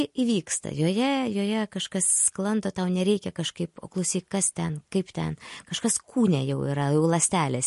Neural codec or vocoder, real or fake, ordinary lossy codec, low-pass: none; real; MP3, 48 kbps; 14.4 kHz